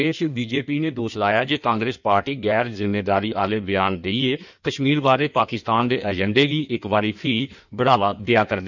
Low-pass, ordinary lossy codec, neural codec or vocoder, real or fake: 7.2 kHz; none; codec, 16 kHz in and 24 kHz out, 1.1 kbps, FireRedTTS-2 codec; fake